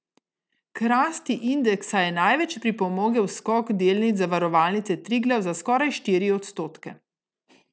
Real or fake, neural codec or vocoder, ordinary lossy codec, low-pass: real; none; none; none